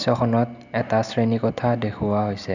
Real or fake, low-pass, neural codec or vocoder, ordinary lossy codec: real; 7.2 kHz; none; none